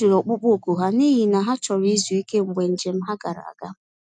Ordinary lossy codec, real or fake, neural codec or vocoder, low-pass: none; real; none; none